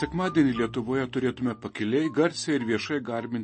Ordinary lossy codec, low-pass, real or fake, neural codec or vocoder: MP3, 32 kbps; 10.8 kHz; real; none